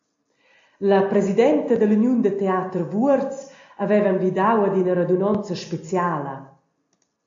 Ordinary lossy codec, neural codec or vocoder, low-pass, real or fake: AAC, 48 kbps; none; 7.2 kHz; real